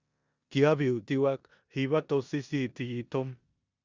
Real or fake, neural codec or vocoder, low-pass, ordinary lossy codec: fake; codec, 16 kHz in and 24 kHz out, 0.9 kbps, LongCat-Audio-Codec, four codebook decoder; 7.2 kHz; Opus, 64 kbps